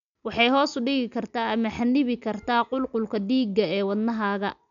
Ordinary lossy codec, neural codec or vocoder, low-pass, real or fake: none; none; 7.2 kHz; real